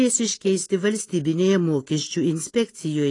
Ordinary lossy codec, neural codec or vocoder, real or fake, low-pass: AAC, 32 kbps; none; real; 10.8 kHz